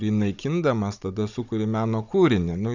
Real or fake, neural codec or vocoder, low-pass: fake; codec, 16 kHz, 16 kbps, FunCodec, trained on Chinese and English, 50 frames a second; 7.2 kHz